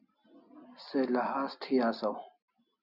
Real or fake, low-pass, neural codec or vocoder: real; 5.4 kHz; none